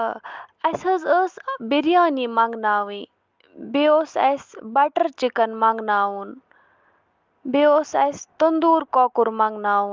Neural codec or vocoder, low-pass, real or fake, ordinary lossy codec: none; 7.2 kHz; real; Opus, 32 kbps